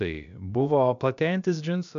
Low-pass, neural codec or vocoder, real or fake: 7.2 kHz; codec, 16 kHz, about 1 kbps, DyCAST, with the encoder's durations; fake